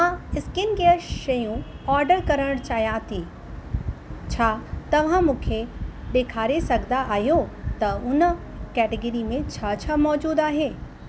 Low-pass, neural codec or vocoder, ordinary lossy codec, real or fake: none; none; none; real